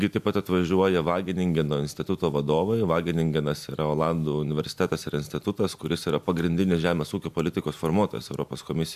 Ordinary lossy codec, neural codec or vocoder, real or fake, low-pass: MP3, 96 kbps; none; real; 14.4 kHz